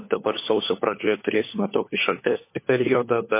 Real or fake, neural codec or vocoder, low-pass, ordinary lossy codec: fake; codec, 16 kHz, 4 kbps, FunCodec, trained on LibriTTS, 50 frames a second; 3.6 kHz; MP3, 24 kbps